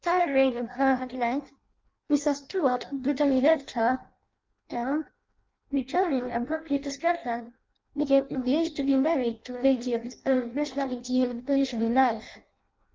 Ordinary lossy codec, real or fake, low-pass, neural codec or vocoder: Opus, 24 kbps; fake; 7.2 kHz; codec, 16 kHz in and 24 kHz out, 0.6 kbps, FireRedTTS-2 codec